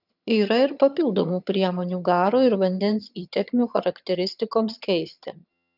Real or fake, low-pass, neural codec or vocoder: fake; 5.4 kHz; vocoder, 22.05 kHz, 80 mel bands, HiFi-GAN